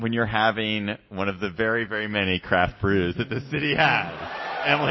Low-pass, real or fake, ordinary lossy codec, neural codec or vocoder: 7.2 kHz; real; MP3, 24 kbps; none